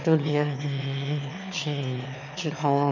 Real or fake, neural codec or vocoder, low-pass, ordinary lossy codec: fake; autoencoder, 22.05 kHz, a latent of 192 numbers a frame, VITS, trained on one speaker; 7.2 kHz; none